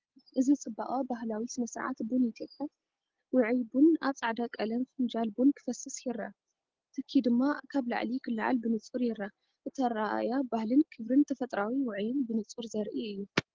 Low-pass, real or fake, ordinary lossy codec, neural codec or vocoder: 7.2 kHz; real; Opus, 16 kbps; none